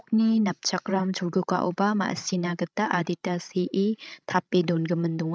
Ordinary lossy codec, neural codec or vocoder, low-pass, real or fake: none; codec, 16 kHz, 16 kbps, FreqCodec, larger model; none; fake